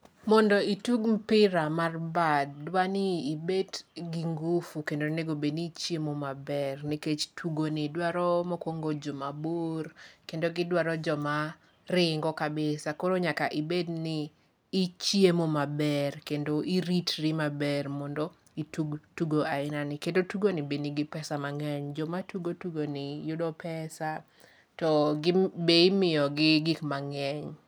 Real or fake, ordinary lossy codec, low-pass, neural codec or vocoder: real; none; none; none